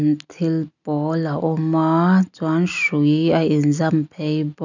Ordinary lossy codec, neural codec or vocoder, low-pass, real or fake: none; none; 7.2 kHz; real